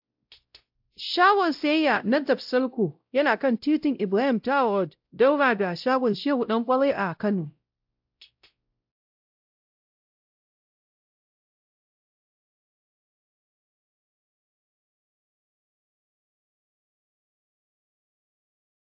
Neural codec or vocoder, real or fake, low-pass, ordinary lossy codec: codec, 16 kHz, 0.5 kbps, X-Codec, WavLM features, trained on Multilingual LibriSpeech; fake; 5.4 kHz; AAC, 48 kbps